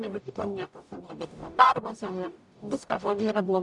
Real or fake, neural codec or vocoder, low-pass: fake; codec, 44.1 kHz, 0.9 kbps, DAC; 10.8 kHz